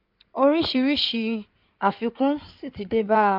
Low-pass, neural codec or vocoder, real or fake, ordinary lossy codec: 5.4 kHz; codec, 16 kHz in and 24 kHz out, 2.2 kbps, FireRedTTS-2 codec; fake; MP3, 48 kbps